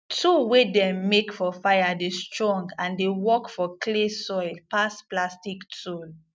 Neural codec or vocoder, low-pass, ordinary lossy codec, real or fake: none; none; none; real